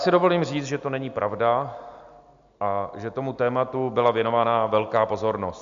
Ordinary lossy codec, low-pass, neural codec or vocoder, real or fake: MP3, 64 kbps; 7.2 kHz; none; real